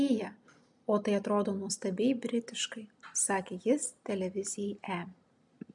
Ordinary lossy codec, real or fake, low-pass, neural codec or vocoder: MP3, 64 kbps; real; 10.8 kHz; none